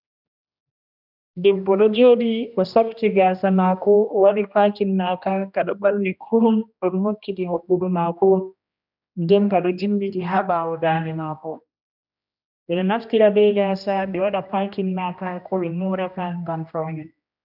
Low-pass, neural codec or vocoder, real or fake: 5.4 kHz; codec, 16 kHz, 1 kbps, X-Codec, HuBERT features, trained on general audio; fake